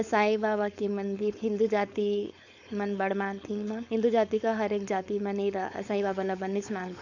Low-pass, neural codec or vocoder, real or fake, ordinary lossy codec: 7.2 kHz; codec, 16 kHz, 4.8 kbps, FACodec; fake; none